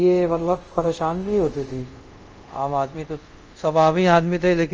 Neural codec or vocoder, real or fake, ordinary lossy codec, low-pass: codec, 24 kHz, 0.5 kbps, DualCodec; fake; Opus, 24 kbps; 7.2 kHz